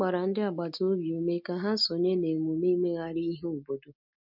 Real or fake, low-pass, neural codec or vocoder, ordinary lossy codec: real; 5.4 kHz; none; none